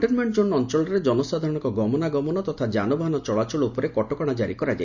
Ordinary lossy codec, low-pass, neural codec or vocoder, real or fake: none; 7.2 kHz; none; real